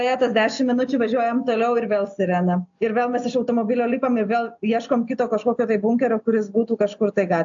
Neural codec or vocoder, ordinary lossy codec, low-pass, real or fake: none; AAC, 64 kbps; 7.2 kHz; real